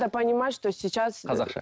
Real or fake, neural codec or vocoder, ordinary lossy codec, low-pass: real; none; none; none